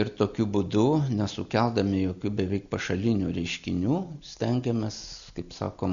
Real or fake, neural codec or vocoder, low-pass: real; none; 7.2 kHz